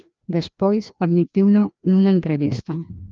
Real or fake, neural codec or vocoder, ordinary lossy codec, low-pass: fake; codec, 16 kHz, 1 kbps, FreqCodec, larger model; Opus, 24 kbps; 7.2 kHz